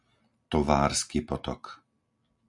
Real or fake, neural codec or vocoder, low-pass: real; none; 10.8 kHz